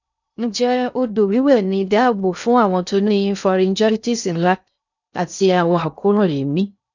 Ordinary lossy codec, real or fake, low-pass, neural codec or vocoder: none; fake; 7.2 kHz; codec, 16 kHz in and 24 kHz out, 0.6 kbps, FocalCodec, streaming, 2048 codes